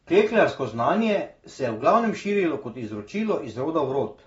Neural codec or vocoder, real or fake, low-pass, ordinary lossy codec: none; real; 9.9 kHz; AAC, 24 kbps